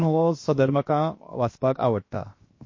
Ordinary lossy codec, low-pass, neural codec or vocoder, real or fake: MP3, 32 kbps; 7.2 kHz; codec, 16 kHz, 0.7 kbps, FocalCodec; fake